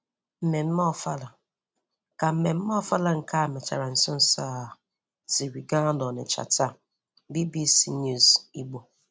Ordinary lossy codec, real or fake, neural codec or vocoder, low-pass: none; real; none; none